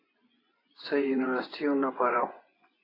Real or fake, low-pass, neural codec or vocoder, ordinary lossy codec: fake; 5.4 kHz; vocoder, 24 kHz, 100 mel bands, Vocos; AAC, 24 kbps